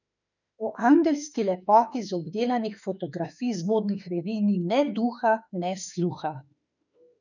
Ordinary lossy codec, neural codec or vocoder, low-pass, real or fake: none; autoencoder, 48 kHz, 32 numbers a frame, DAC-VAE, trained on Japanese speech; 7.2 kHz; fake